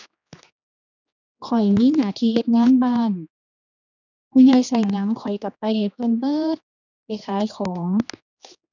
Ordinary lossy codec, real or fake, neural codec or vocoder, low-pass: none; fake; codec, 16 kHz, 2 kbps, X-Codec, HuBERT features, trained on general audio; 7.2 kHz